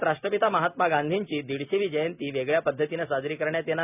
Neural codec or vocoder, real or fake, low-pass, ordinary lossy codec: none; real; 3.6 kHz; none